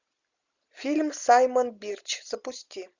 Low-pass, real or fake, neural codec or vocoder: 7.2 kHz; real; none